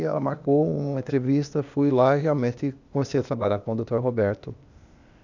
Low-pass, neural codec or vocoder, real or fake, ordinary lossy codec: 7.2 kHz; codec, 16 kHz, 0.8 kbps, ZipCodec; fake; none